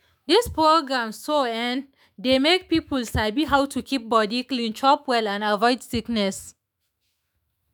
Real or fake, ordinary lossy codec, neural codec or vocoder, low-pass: fake; none; autoencoder, 48 kHz, 128 numbers a frame, DAC-VAE, trained on Japanese speech; none